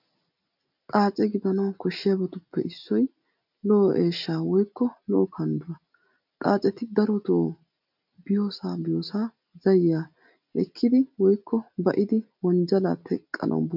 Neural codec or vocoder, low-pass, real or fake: none; 5.4 kHz; real